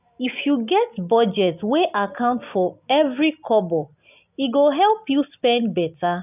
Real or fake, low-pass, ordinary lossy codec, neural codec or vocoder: real; 3.6 kHz; none; none